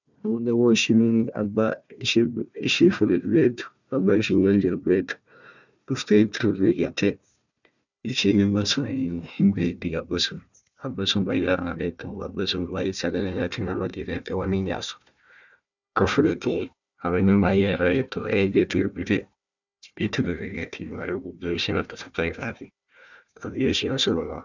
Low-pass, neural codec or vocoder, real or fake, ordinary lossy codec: 7.2 kHz; codec, 16 kHz, 1 kbps, FunCodec, trained on Chinese and English, 50 frames a second; fake; none